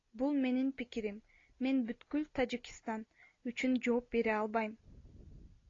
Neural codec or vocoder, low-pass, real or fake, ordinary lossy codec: none; 7.2 kHz; real; AAC, 32 kbps